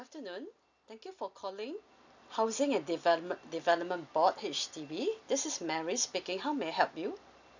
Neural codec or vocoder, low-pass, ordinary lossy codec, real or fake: none; 7.2 kHz; none; real